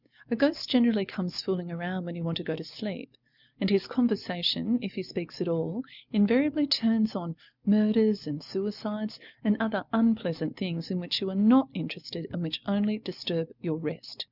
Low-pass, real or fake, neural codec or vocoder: 5.4 kHz; real; none